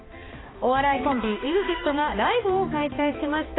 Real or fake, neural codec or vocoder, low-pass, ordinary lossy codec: fake; codec, 16 kHz, 2 kbps, X-Codec, HuBERT features, trained on balanced general audio; 7.2 kHz; AAC, 16 kbps